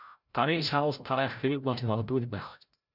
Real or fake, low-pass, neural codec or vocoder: fake; 5.4 kHz; codec, 16 kHz, 0.5 kbps, FreqCodec, larger model